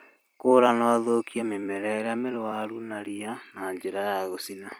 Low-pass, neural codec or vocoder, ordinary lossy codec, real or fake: none; vocoder, 44.1 kHz, 128 mel bands every 256 samples, BigVGAN v2; none; fake